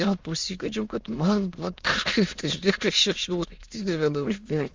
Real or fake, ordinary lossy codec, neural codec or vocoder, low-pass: fake; Opus, 32 kbps; autoencoder, 22.05 kHz, a latent of 192 numbers a frame, VITS, trained on many speakers; 7.2 kHz